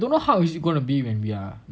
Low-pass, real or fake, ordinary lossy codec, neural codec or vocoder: none; real; none; none